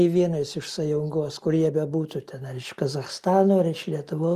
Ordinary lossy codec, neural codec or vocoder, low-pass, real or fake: Opus, 32 kbps; none; 14.4 kHz; real